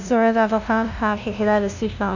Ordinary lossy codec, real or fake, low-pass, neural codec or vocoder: none; fake; 7.2 kHz; codec, 16 kHz, 0.5 kbps, FunCodec, trained on LibriTTS, 25 frames a second